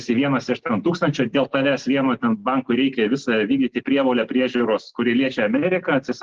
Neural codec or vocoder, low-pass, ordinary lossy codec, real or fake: none; 7.2 kHz; Opus, 16 kbps; real